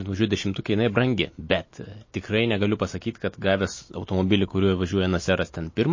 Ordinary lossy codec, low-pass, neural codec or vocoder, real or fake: MP3, 32 kbps; 7.2 kHz; none; real